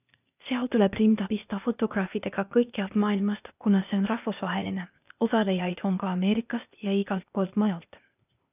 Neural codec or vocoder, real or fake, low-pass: codec, 16 kHz, 0.8 kbps, ZipCodec; fake; 3.6 kHz